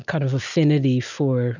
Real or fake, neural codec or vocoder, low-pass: real; none; 7.2 kHz